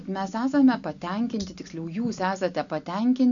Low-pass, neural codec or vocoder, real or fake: 7.2 kHz; none; real